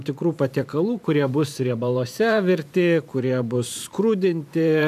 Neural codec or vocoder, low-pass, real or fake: vocoder, 44.1 kHz, 128 mel bands every 512 samples, BigVGAN v2; 14.4 kHz; fake